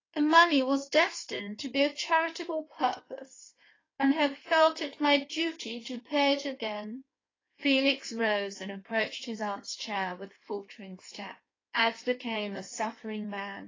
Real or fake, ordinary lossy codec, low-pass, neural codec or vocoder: fake; AAC, 32 kbps; 7.2 kHz; codec, 16 kHz in and 24 kHz out, 1.1 kbps, FireRedTTS-2 codec